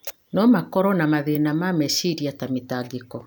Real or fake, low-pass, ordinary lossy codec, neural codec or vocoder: real; none; none; none